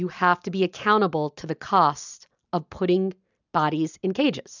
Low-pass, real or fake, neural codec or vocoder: 7.2 kHz; real; none